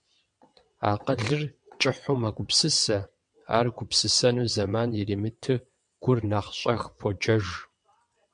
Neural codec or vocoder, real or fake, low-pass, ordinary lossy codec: vocoder, 22.05 kHz, 80 mel bands, WaveNeXt; fake; 9.9 kHz; MP3, 64 kbps